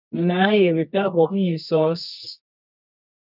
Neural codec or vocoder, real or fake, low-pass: codec, 24 kHz, 0.9 kbps, WavTokenizer, medium music audio release; fake; 5.4 kHz